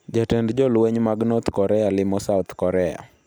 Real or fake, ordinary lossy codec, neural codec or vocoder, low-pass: real; none; none; none